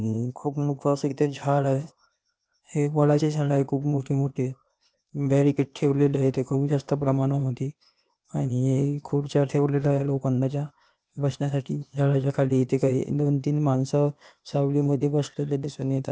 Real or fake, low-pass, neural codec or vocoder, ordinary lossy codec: fake; none; codec, 16 kHz, 0.8 kbps, ZipCodec; none